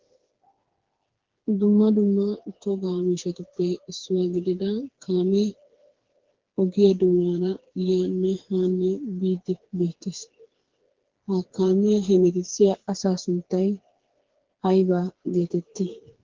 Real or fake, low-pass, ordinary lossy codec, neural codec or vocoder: fake; 7.2 kHz; Opus, 16 kbps; codec, 16 kHz, 4 kbps, FreqCodec, smaller model